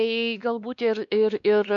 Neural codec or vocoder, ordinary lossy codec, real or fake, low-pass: codec, 16 kHz, 2 kbps, X-Codec, WavLM features, trained on Multilingual LibriSpeech; AAC, 64 kbps; fake; 7.2 kHz